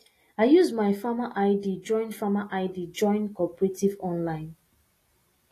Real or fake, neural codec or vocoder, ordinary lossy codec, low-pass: real; none; AAC, 48 kbps; 14.4 kHz